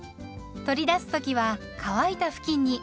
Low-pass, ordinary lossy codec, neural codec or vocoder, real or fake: none; none; none; real